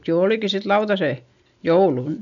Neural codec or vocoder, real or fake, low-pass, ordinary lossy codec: none; real; 7.2 kHz; none